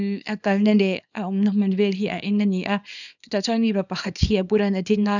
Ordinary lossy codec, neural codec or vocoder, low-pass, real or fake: none; codec, 24 kHz, 0.9 kbps, WavTokenizer, small release; 7.2 kHz; fake